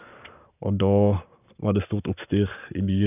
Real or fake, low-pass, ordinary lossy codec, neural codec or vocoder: fake; 3.6 kHz; none; codec, 44.1 kHz, 7.8 kbps, Pupu-Codec